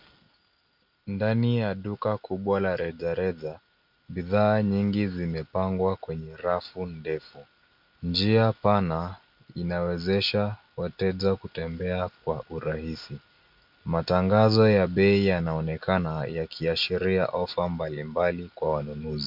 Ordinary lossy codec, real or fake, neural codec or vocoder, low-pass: MP3, 48 kbps; real; none; 5.4 kHz